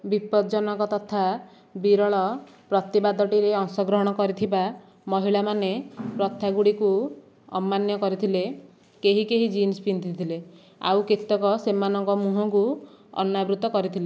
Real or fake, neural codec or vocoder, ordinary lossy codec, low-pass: real; none; none; none